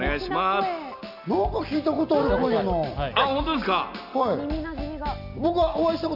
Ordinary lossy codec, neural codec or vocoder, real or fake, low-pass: none; none; real; 5.4 kHz